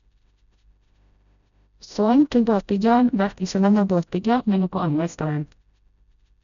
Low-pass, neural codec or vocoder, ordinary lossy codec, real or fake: 7.2 kHz; codec, 16 kHz, 0.5 kbps, FreqCodec, smaller model; none; fake